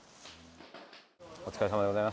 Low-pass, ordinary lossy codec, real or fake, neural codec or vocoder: none; none; real; none